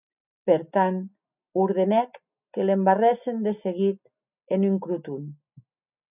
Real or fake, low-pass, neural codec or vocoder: real; 3.6 kHz; none